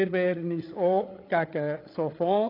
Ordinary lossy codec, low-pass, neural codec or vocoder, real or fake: AAC, 24 kbps; 5.4 kHz; codec, 16 kHz, 8 kbps, FreqCodec, larger model; fake